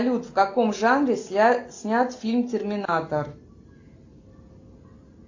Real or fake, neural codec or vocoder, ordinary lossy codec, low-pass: real; none; AAC, 48 kbps; 7.2 kHz